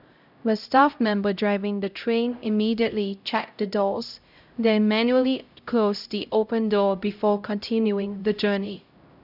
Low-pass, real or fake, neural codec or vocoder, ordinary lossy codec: 5.4 kHz; fake; codec, 16 kHz, 0.5 kbps, X-Codec, HuBERT features, trained on LibriSpeech; none